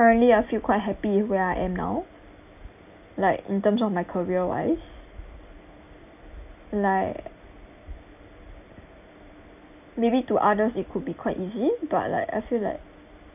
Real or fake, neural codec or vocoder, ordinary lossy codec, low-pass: real; none; none; 3.6 kHz